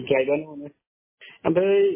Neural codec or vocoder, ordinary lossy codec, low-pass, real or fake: none; MP3, 16 kbps; 3.6 kHz; real